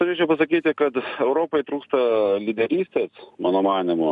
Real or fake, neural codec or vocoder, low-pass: real; none; 10.8 kHz